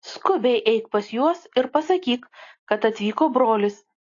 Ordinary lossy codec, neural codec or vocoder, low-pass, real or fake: AAC, 32 kbps; none; 7.2 kHz; real